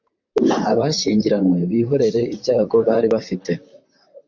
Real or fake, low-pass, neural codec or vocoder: fake; 7.2 kHz; vocoder, 44.1 kHz, 128 mel bands, Pupu-Vocoder